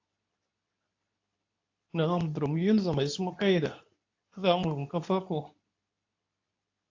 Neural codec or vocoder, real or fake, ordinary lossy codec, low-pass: codec, 24 kHz, 0.9 kbps, WavTokenizer, medium speech release version 2; fake; AAC, 48 kbps; 7.2 kHz